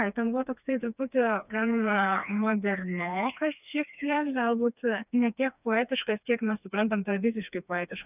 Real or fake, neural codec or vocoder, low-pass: fake; codec, 16 kHz, 2 kbps, FreqCodec, smaller model; 3.6 kHz